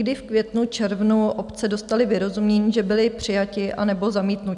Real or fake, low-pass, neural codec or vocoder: real; 10.8 kHz; none